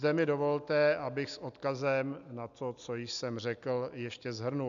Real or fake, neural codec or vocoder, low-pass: real; none; 7.2 kHz